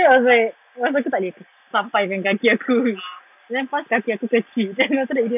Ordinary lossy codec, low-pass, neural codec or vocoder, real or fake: none; 3.6 kHz; none; real